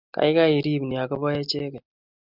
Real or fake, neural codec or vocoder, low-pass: real; none; 5.4 kHz